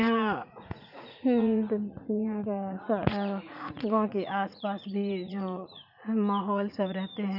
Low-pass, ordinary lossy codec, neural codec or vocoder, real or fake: 5.4 kHz; none; vocoder, 44.1 kHz, 80 mel bands, Vocos; fake